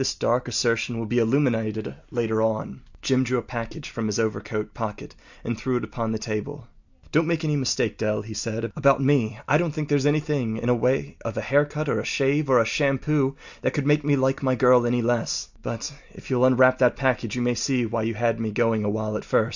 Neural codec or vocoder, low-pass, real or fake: none; 7.2 kHz; real